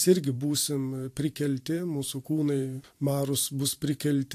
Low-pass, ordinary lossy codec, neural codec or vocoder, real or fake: 14.4 kHz; AAC, 64 kbps; vocoder, 44.1 kHz, 128 mel bands every 512 samples, BigVGAN v2; fake